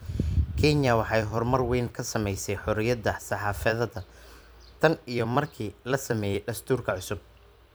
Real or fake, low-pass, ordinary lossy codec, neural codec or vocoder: fake; none; none; vocoder, 44.1 kHz, 128 mel bands every 256 samples, BigVGAN v2